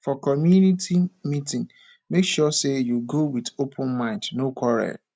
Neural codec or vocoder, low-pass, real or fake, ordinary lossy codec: none; none; real; none